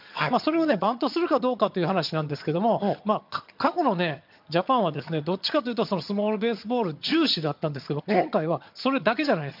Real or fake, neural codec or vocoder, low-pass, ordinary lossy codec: fake; vocoder, 22.05 kHz, 80 mel bands, HiFi-GAN; 5.4 kHz; MP3, 48 kbps